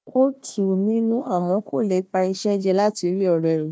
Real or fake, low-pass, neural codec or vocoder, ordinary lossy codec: fake; none; codec, 16 kHz, 1 kbps, FunCodec, trained on Chinese and English, 50 frames a second; none